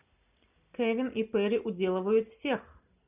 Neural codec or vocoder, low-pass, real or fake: none; 3.6 kHz; real